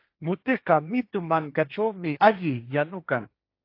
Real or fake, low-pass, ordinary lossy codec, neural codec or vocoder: fake; 5.4 kHz; AAC, 32 kbps; codec, 16 kHz, 1.1 kbps, Voila-Tokenizer